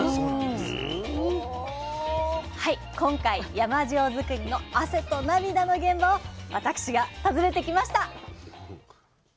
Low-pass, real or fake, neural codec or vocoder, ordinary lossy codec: none; real; none; none